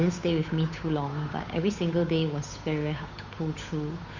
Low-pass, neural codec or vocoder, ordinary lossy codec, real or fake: 7.2 kHz; codec, 16 kHz, 8 kbps, FunCodec, trained on Chinese and English, 25 frames a second; MP3, 48 kbps; fake